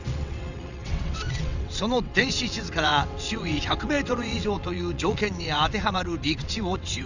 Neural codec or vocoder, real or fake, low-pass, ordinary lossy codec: vocoder, 22.05 kHz, 80 mel bands, WaveNeXt; fake; 7.2 kHz; none